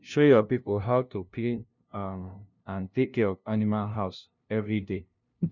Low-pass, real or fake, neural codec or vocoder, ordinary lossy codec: 7.2 kHz; fake; codec, 16 kHz, 0.5 kbps, FunCodec, trained on LibriTTS, 25 frames a second; none